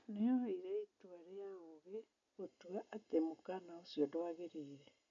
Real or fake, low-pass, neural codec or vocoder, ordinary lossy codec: real; 7.2 kHz; none; MP3, 64 kbps